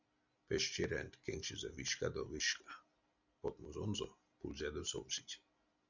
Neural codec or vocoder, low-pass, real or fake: none; 7.2 kHz; real